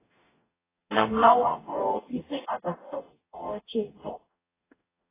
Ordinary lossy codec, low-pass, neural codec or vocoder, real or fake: AAC, 16 kbps; 3.6 kHz; codec, 44.1 kHz, 0.9 kbps, DAC; fake